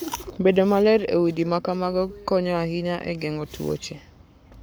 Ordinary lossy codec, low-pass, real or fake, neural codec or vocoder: none; none; fake; codec, 44.1 kHz, 7.8 kbps, Pupu-Codec